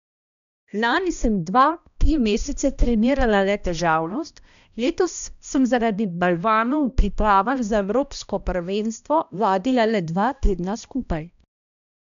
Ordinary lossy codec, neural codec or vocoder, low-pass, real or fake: none; codec, 16 kHz, 1 kbps, X-Codec, HuBERT features, trained on balanced general audio; 7.2 kHz; fake